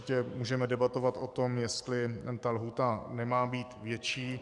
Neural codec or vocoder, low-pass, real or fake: codec, 44.1 kHz, 7.8 kbps, DAC; 10.8 kHz; fake